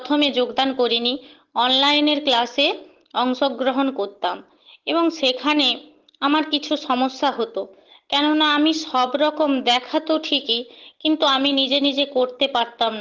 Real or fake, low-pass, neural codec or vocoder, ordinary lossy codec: real; 7.2 kHz; none; Opus, 16 kbps